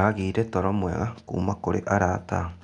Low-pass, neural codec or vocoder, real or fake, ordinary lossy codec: 9.9 kHz; none; real; none